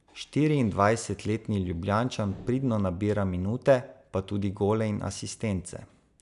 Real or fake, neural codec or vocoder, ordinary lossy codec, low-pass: real; none; none; 10.8 kHz